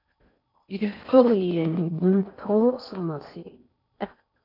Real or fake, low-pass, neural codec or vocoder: fake; 5.4 kHz; codec, 16 kHz in and 24 kHz out, 0.6 kbps, FocalCodec, streaming, 4096 codes